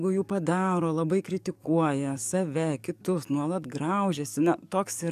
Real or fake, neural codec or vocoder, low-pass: fake; codec, 44.1 kHz, 7.8 kbps, DAC; 14.4 kHz